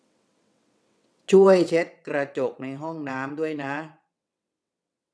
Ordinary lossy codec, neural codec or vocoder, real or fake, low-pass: none; vocoder, 22.05 kHz, 80 mel bands, WaveNeXt; fake; none